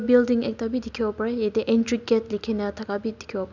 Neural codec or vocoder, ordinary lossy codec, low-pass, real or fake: none; none; 7.2 kHz; real